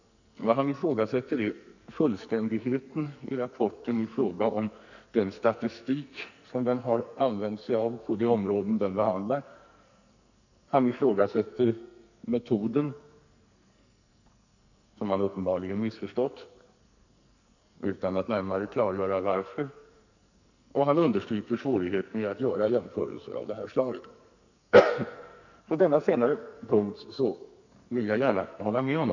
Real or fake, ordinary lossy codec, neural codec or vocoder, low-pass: fake; none; codec, 44.1 kHz, 2.6 kbps, SNAC; 7.2 kHz